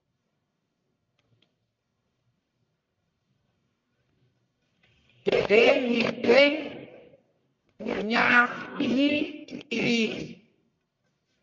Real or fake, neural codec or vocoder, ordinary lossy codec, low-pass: fake; codec, 44.1 kHz, 1.7 kbps, Pupu-Codec; MP3, 48 kbps; 7.2 kHz